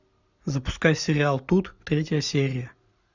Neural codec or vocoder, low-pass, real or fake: none; 7.2 kHz; real